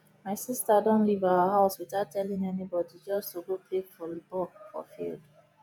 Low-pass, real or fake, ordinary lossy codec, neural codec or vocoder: none; fake; none; vocoder, 48 kHz, 128 mel bands, Vocos